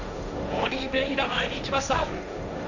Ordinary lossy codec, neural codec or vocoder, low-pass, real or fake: none; codec, 16 kHz, 1.1 kbps, Voila-Tokenizer; 7.2 kHz; fake